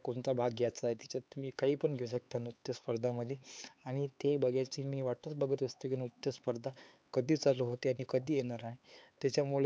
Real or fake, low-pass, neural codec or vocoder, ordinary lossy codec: fake; none; codec, 16 kHz, 4 kbps, X-Codec, HuBERT features, trained on LibriSpeech; none